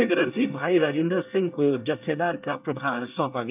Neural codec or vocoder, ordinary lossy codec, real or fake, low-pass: codec, 24 kHz, 1 kbps, SNAC; none; fake; 3.6 kHz